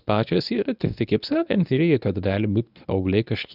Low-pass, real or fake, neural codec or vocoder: 5.4 kHz; fake; codec, 24 kHz, 0.9 kbps, WavTokenizer, medium speech release version 1